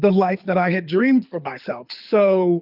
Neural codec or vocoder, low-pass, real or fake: codec, 24 kHz, 6 kbps, HILCodec; 5.4 kHz; fake